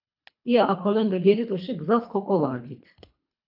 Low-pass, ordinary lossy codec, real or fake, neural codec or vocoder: 5.4 kHz; AAC, 48 kbps; fake; codec, 24 kHz, 3 kbps, HILCodec